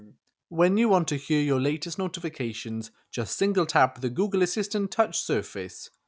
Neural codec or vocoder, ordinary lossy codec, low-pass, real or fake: none; none; none; real